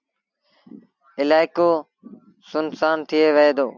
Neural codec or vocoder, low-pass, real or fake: none; 7.2 kHz; real